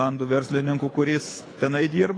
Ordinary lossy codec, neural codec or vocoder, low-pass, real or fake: AAC, 32 kbps; codec, 24 kHz, 6 kbps, HILCodec; 9.9 kHz; fake